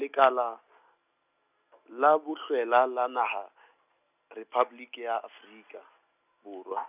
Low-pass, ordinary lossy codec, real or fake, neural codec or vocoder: 3.6 kHz; none; real; none